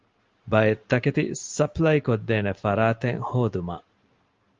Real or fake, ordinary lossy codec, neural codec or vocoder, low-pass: real; Opus, 32 kbps; none; 7.2 kHz